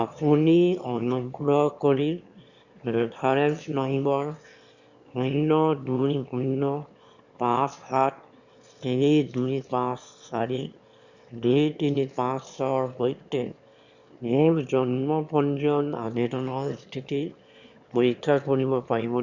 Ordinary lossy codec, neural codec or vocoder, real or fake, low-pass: Opus, 64 kbps; autoencoder, 22.05 kHz, a latent of 192 numbers a frame, VITS, trained on one speaker; fake; 7.2 kHz